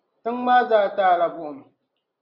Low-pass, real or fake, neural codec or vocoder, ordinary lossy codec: 5.4 kHz; real; none; Opus, 64 kbps